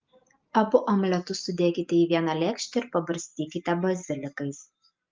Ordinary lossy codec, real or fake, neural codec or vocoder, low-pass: Opus, 32 kbps; real; none; 7.2 kHz